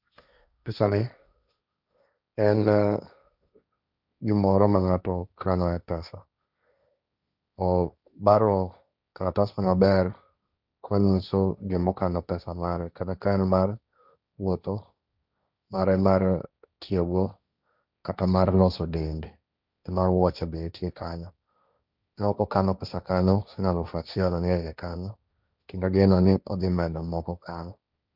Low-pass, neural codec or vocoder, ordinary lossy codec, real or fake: 5.4 kHz; codec, 16 kHz, 1.1 kbps, Voila-Tokenizer; none; fake